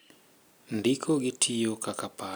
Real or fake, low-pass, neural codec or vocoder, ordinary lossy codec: real; none; none; none